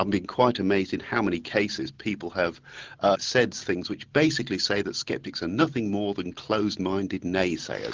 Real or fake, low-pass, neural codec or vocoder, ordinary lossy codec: real; 7.2 kHz; none; Opus, 16 kbps